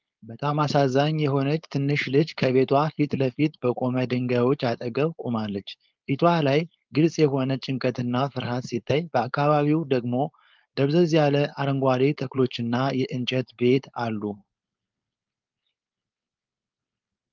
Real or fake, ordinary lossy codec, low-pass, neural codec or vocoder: fake; Opus, 24 kbps; 7.2 kHz; codec, 16 kHz, 4.8 kbps, FACodec